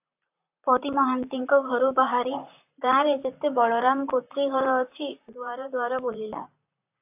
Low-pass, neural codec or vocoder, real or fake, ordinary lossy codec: 3.6 kHz; vocoder, 44.1 kHz, 128 mel bands, Pupu-Vocoder; fake; AAC, 32 kbps